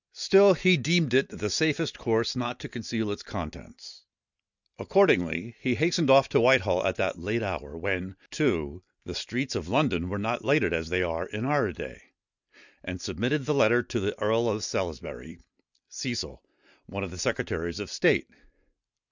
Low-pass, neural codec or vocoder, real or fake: 7.2 kHz; none; real